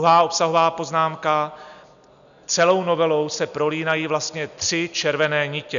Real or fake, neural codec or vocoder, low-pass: real; none; 7.2 kHz